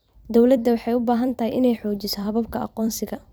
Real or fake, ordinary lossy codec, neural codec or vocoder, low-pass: fake; none; vocoder, 44.1 kHz, 128 mel bands every 256 samples, BigVGAN v2; none